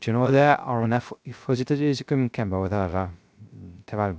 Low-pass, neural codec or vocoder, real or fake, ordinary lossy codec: none; codec, 16 kHz, 0.3 kbps, FocalCodec; fake; none